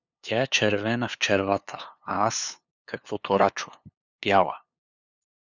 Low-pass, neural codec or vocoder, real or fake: 7.2 kHz; codec, 16 kHz, 2 kbps, FunCodec, trained on LibriTTS, 25 frames a second; fake